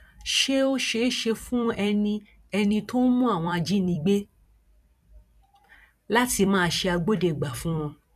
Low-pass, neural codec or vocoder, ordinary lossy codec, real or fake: 14.4 kHz; vocoder, 44.1 kHz, 128 mel bands every 256 samples, BigVGAN v2; none; fake